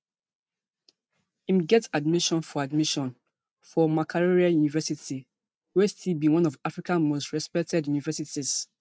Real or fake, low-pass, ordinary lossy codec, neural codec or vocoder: real; none; none; none